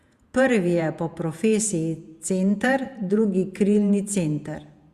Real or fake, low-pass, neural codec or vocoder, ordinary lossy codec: fake; 14.4 kHz; vocoder, 48 kHz, 128 mel bands, Vocos; Opus, 64 kbps